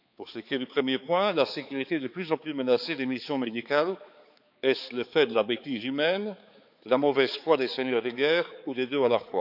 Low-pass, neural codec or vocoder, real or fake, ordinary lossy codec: 5.4 kHz; codec, 16 kHz, 4 kbps, X-Codec, HuBERT features, trained on balanced general audio; fake; none